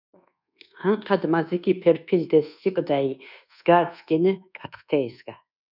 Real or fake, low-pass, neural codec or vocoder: fake; 5.4 kHz; codec, 24 kHz, 1.2 kbps, DualCodec